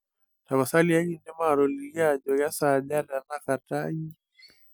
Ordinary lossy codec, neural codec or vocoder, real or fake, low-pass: none; none; real; none